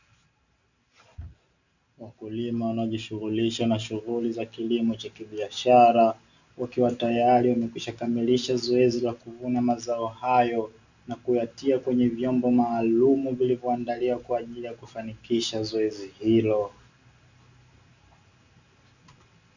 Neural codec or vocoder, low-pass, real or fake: none; 7.2 kHz; real